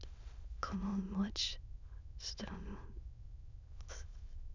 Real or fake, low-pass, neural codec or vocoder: fake; 7.2 kHz; autoencoder, 22.05 kHz, a latent of 192 numbers a frame, VITS, trained on many speakers